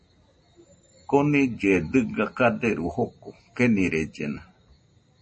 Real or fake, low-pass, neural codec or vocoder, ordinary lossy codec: real; 10.8 kHz; none; MP3, 32 kbps